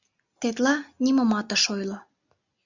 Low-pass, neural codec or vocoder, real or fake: 7.2 kHz; none; real